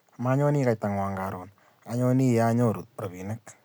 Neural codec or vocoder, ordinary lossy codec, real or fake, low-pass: none; none; real; none